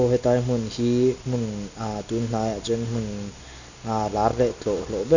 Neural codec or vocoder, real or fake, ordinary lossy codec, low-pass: none; real; none; 7.2 kHz